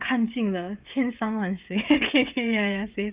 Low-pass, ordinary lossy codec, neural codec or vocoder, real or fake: 3.6 kHz; Opus, 32 kbps; codec, 16 kHz, 16 kbps, FreqCodec, smaller model; fake